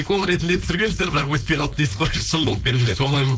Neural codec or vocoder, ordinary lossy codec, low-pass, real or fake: codec, 16 kHz, 4.8 kbps, FACodec; none; none; fake